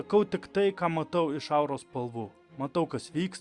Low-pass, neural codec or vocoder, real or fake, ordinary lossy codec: 10.8 kHz; none; real; Opus, 64 kbps